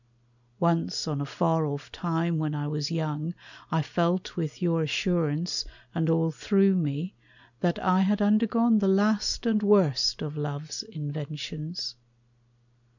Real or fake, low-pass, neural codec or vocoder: real; 7.2 kHz; none